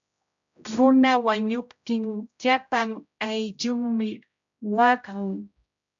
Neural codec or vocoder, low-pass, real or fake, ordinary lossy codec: codec, 16 kHz, 0.5 kbps, X-Codec, HuBERT features, trained on general audio; 7.2 kHz; fake; AAC, 64 kbps